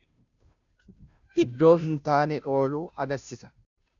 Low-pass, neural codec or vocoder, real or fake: 7.2 kHz; codec, 16 kHz, 0.5 kbps, FunCodec, trained on Chinese and English, 25 frames a second; fake